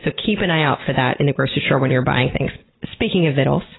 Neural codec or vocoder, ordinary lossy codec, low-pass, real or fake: none; AAC, 16 kbps; 7.2 kHz; real